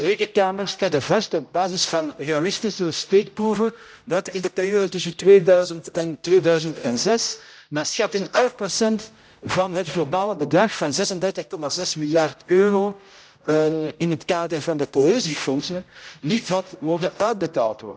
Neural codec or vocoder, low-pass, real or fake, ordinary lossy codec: codec, 16 kHz, 0.5 kbps, X-Codec, HuBERT features, trained on general audio; none; fake; none